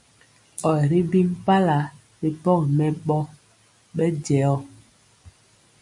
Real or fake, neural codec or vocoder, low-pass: real; none; 10.8 kHz